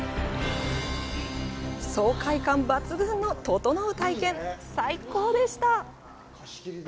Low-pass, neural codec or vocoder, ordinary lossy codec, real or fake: none; none; none; real